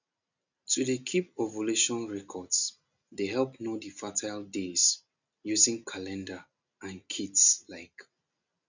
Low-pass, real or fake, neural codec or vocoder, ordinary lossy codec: 7.2 kHz; real; none; none